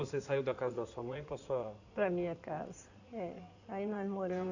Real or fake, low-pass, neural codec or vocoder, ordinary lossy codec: fake; 7.2 kHz; codec, 16 kHz in and 24 kHz out, 2.2 kbps, FireRedTTS-2 codec; none